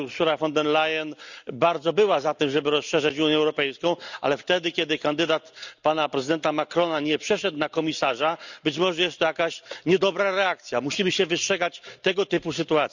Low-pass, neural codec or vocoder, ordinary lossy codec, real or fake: 7.2 kHz; none; none; real